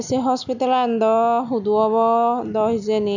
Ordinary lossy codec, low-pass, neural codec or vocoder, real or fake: none; 7.2 kHz; none; real